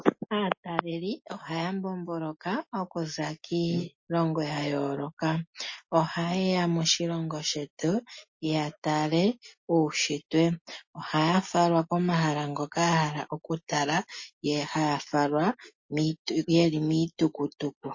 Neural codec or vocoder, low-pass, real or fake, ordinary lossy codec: none; 7.2 kHz; real; MP3, 32 kbps